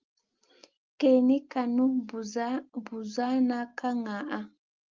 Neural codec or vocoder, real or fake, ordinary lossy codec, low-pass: none; real; Opus, 32 kbps; 7.2 kHz